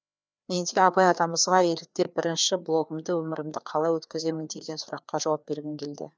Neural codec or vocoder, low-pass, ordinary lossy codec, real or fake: codec, 16 kHz, 2 kbps, FreqCodec, larger model; none; none; fake